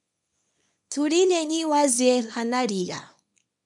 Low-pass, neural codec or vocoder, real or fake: 10.8 kHz; codec, 24 kHz, 0.9 kbps, WavTokenizer, small release; fake